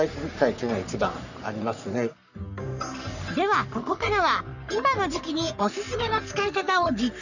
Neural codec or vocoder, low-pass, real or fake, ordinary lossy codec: codec, 44.1 kHz, 3.4 kbps, Pupu-Codec; 7.2 kHz; fake; none